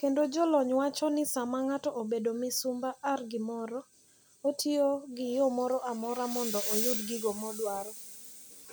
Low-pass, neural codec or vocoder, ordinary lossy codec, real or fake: none; none; none; real